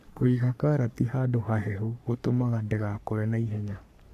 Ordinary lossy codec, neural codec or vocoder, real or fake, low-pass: none; codec, 44.1 kHz, 3.4 kbps, Pupu-Codec; fake; 14.4 kHz